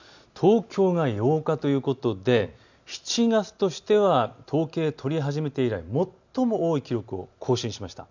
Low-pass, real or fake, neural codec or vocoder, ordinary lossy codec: 7.2 kHz; real; none; none